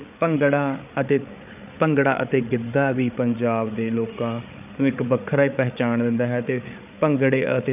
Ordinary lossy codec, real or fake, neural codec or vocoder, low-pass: none; fake; codec, 16 kHz, 8 kbps, FreqCodec, larger model; 3.6 kHz